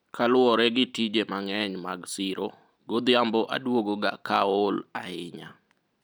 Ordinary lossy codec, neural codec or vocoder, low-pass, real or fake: none; none; none; real